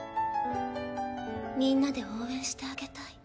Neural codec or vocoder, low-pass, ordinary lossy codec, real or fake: none; none; none; real